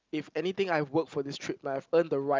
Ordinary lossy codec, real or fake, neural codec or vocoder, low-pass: Opus, 32 kbps; real; none; 7.2 kHz